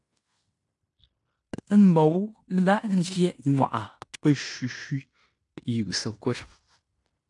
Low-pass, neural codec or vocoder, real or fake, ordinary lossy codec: 10.8 kHz; codec, 16 kHz in and 24 kHz out, 0.9 kbps, LongCat-Audio-Codec, fine tuned four codebook decoder; fake; AAC, 64 kbps